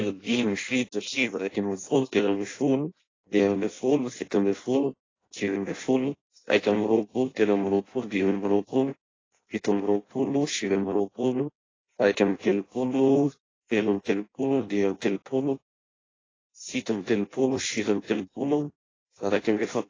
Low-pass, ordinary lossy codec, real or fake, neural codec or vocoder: 7.2 kHz; AAC, 32 kbps; fake; codec, 16 kHz in and 24 kHz out, 0.6 kbps, FireRedTTS-2 codec